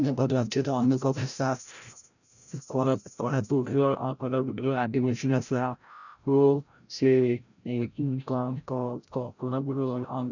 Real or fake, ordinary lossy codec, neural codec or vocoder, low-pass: fake; none; codec, 16 kHz, 0.5 kbps, FreqCodec, larger model; 7.2 kHz